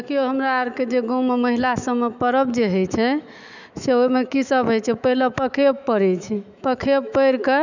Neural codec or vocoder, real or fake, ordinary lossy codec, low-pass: autoencoder, 48 kHz, 128 numbers a frame, DAC-VAE, trained on Japanese speech; fake; none; 7.2 kHz